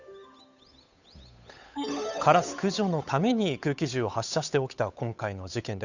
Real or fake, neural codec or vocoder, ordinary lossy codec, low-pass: fake; codec, 16 kHz, 8 kbps, FunCodec, trained on Chinese and English, 25 frames a second; none; 7.2 kHz